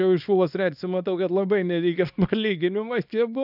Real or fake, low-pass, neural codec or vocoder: fake; 5.4 kHz; codec, 24 kHz, 0.9 kbps, WavTokenizer, small release